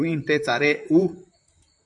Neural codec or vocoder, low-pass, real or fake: vocoder, 44.1 kHz, 128 mel bands, Pupu-Vocoder; 10.8 kHz; fake